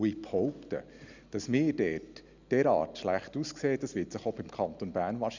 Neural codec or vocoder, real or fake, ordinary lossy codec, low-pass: none; real; none; 7.2 kHz